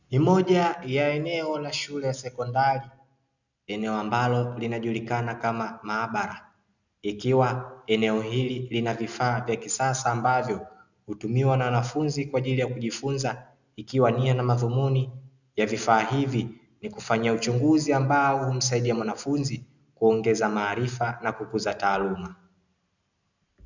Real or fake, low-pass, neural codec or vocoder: real; 7.2 kHz; none